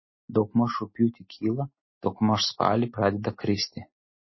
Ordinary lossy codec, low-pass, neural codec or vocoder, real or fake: MP3, 24 kbps; 7.2 kHz; none; real